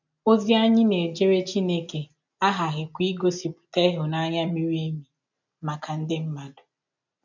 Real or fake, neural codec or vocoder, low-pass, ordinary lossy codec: real; none; 7.2 kHz; none